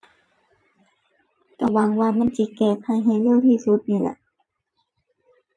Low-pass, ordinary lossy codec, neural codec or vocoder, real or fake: none; none; vocoder, 22.05 kHz, 80 mel bands, Vocos; fake